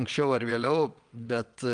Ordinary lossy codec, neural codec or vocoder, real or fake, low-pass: Opus, 32 kbps; vocoder, 22.05 kHz, 80 mel bands, WaveNeXt; fake; 9.9 kHz